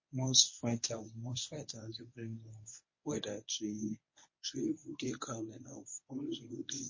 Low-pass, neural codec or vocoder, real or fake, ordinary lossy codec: 7.2 kHz; codec, 24 kHz, 0.9 kbps, WavTokenizer, medium speech release version 1; fake; MP3, 32 kbps